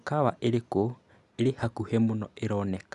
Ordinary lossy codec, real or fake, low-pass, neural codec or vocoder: none; real; 10.8 kHz; none